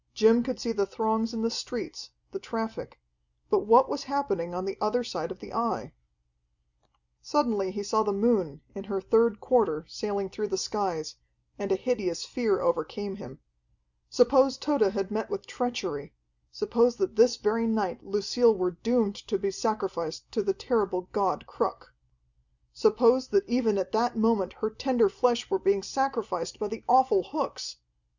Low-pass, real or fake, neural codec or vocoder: 7.2 kHz; real; none